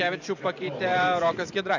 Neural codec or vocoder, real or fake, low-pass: vocoder, 44.1 kHz, 128 mel bands every 512 samples, BigVGAN v2; fake; 7.2 kHz